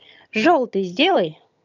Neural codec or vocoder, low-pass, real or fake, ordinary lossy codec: vocoder, 22.05 kHz, 80 mel bands, HiFi-GAN; 7.2 kHz; fake; none